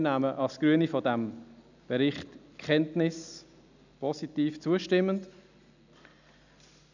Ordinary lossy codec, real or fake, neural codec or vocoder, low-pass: none; real; none; 7.2 kHz